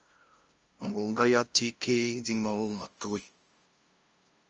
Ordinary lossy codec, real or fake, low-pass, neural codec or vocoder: Opus, 24 kbps; fake; 7.2 kHz; codec, 16 kHz, 0.5 kbps, FunCodec, trained on LibriTTS, 25 frames a second